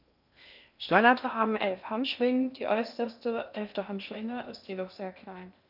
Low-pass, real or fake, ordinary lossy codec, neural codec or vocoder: 5.4 kHz; fake; none; codec, 16 kHz in and 24 kHz out, 0.6 kbps, FocalCodec, streaming, 2048 codes